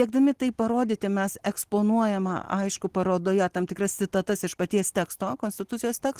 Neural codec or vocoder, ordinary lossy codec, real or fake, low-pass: none; Opus, 16 kbps; real; 14.4 kHz